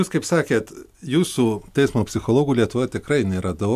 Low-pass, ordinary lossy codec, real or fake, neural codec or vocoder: 14.4 kHz; MP3, 96 kbps; real; none